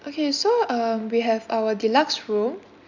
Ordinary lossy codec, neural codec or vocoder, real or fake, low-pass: none; none; real; 7.2 kHz